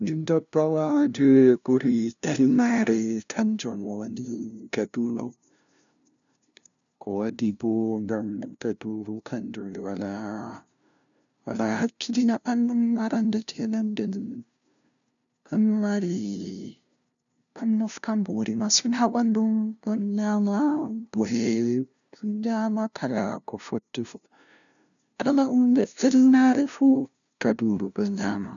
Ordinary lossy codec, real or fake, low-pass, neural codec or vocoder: none; fake; 7.2 kHz; codec, 16 kHz, 0.5 kbps, FunCodec, trained on LibriTTS, 25 frames a second